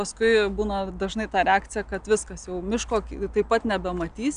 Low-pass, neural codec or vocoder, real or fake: 9.9 kHz; none; real